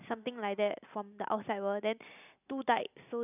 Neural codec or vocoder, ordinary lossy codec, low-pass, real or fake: none; none; 3.6 kHz; real